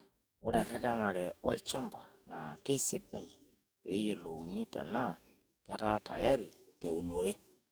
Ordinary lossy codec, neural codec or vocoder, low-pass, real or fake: none; codec, 44.1 kHz, 2.6 kbps, DAC; none; fake